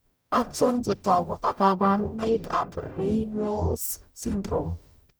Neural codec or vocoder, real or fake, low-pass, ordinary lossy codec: codec, 44.1 kHz, 0.9 kbps, DAC; fake; none; none